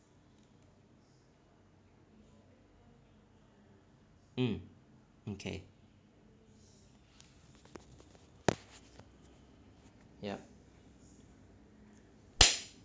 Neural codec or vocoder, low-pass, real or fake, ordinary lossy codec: none; none; real; none